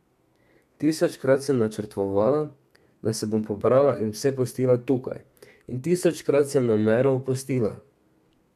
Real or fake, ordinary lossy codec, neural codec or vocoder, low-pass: fake; MP3, 96 kbps; codec, 32 kHz, 1.9 kbps, SNAC; 14.4 kHz